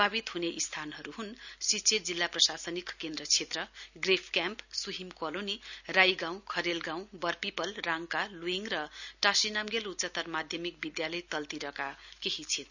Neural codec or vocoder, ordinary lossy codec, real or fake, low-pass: none; none; real; 7.2 kHz